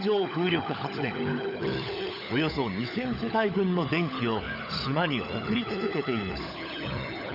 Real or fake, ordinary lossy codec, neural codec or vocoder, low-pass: fake; AAC, 48 kbps; codec, 16 kHz, 16 kbps, FunCodec, trained on LibriTTS, 50 frames a second; 5.4 kHz